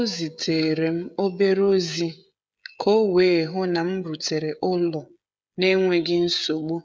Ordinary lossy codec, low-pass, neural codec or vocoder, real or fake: none; none; codec, 16 kHz, 16 kbps, FreqCodec, smaller model; fake